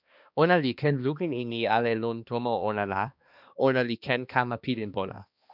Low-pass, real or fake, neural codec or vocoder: 5.4 kHz; fake; codec, 16 kHz, 2 kbps, X-Codec, HuBERT features, trained on balanced general audio